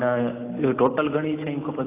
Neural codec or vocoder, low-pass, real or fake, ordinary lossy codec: none; 3.6 kHz; real; AAC, 24 kbps